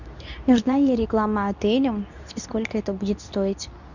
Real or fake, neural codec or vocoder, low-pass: fake; codec, 24 kHz, 0.9 kbps, WavTokenizer, medium speech release version 2; 7.2 kHz